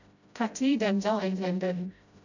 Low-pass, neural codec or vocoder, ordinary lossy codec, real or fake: 7.2 kHz; codec, 16 kHz, 0.5 kbps, FreqCodec, smaller model; none; fake